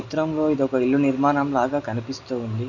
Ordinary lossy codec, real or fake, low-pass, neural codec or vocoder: none; real; 7.2 kHz; none